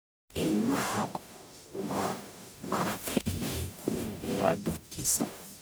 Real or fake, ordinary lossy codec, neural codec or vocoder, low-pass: fake; none; codec, 44.1 kHz, 0.9 kbps, DAC; none